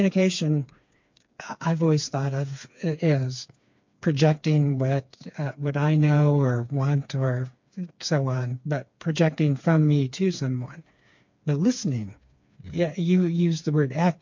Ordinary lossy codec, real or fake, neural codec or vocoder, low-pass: MP3, 48 kbps; fake; codec, 16 kHz, 4 kbps, FreqCodec, smaller model; 7.2 kHz